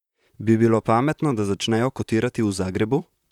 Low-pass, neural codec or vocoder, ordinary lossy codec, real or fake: 19.8 kHz; vocoder, 44.1 kHz, 128 mel bands, Pupu-Vocoder; none; fake